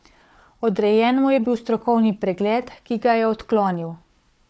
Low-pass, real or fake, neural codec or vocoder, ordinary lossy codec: none; fake; codec, 16 kHz, 4 kbps, FunCodec, trained on Chinese and English, 50 frames a second; none